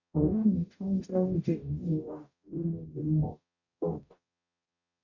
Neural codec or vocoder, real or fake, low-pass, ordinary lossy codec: codec, 44.1 kHz, 0.9 kbps, DAC; fake; 7.2 kHz; none